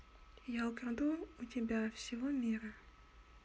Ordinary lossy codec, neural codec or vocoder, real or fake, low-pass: none; none; real; none